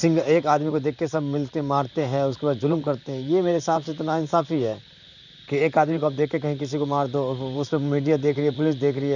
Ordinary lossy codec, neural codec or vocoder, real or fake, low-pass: none; vocoder, 44.1 kHz, 128 mel bands, Pupu-Vocoder; fake; 7.2 kHz